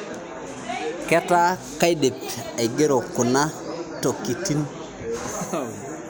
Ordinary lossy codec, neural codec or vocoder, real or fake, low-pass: none; none; real; none